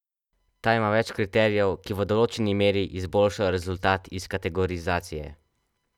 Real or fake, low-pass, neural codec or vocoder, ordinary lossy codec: real; 19.8 kHz; none; none